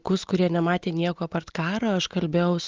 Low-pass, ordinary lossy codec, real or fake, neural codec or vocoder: 7.2 kHz; Opus, 16 kbps; real; none